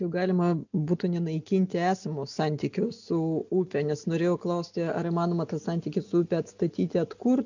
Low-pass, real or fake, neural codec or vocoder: 7.2 kHz; real; none